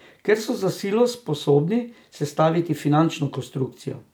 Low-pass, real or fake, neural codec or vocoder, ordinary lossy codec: none; real; none; none